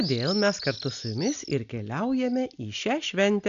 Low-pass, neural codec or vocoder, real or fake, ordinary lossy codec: 7.2 kHz; none; real; MP3, 96 kbps